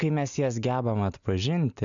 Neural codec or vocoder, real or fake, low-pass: none; real; 7.2 kHz